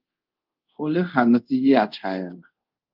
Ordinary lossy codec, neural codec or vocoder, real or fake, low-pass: Opus, 24 kbps; codec, 24 kHz, 0.5 kbps, DualCodec; fake; 5.4 kHz